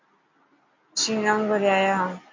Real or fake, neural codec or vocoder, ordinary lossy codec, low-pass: real; none; MP3, 48 kbps; 7.2 kHz